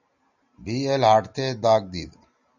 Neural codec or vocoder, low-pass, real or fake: none; 7.2 kHz; real